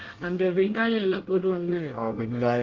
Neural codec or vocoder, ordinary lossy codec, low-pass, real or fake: codec, 24 kHz, 1 kbps, SNAC; Opus, 16 kbps; 7.2 kHz; fake